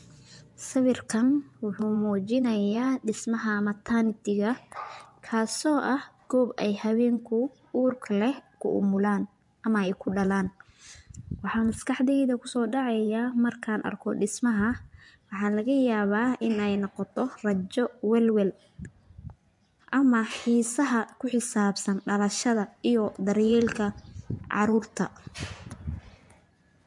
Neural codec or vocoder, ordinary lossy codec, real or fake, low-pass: vocoder, 24 kHz, 100 mel bands, Vocos; MP3, 64 kbps; fake; 10.8 kHz